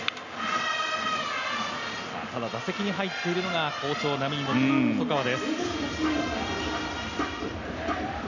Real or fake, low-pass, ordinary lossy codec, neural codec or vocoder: real; 7.2 kHz; none; none